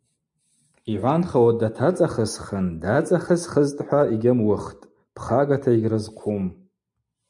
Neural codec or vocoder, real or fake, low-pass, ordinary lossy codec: none; real; 10.8 kHz; MP3, 64 kbps